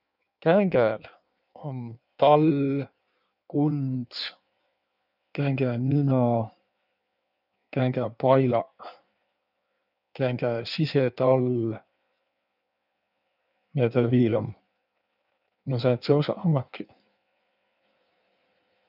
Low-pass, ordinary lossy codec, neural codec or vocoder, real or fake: 5.4 kHz; none; codec, 16 kHz in and 24 kHz out, 1.1 kbps, FireRedTTS-2 codec; fake